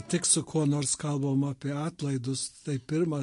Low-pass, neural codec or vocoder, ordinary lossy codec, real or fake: 10.8 kHz; none; MP3, 48 kbps; real